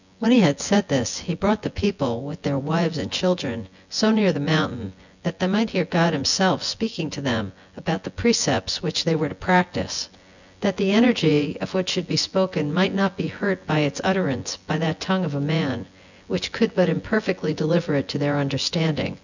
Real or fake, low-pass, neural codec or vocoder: fake; 7.2 kHz; vocoder, 24 kHz, 100 mel bands, Vocos